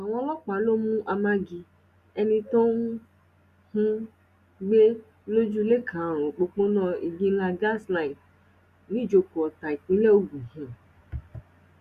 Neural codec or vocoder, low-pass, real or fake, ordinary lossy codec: none; 14.4 kHz; real; Opus, 64 kbps